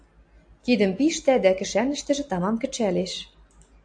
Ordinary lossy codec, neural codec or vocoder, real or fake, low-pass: AAC, 64 kbps; none; real; 9.9 kHz